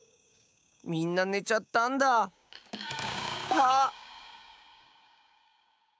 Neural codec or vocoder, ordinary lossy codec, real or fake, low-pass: codec, 16 kHz, 6 kbps, DAC; none; fake; none